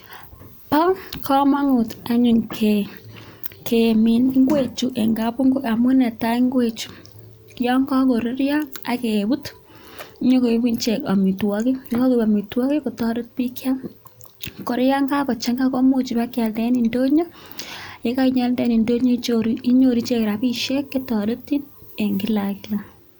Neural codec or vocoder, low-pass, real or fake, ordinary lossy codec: none; none; real; none